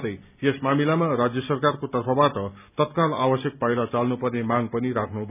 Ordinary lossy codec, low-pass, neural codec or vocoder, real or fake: none; 3.6 kHz; none; real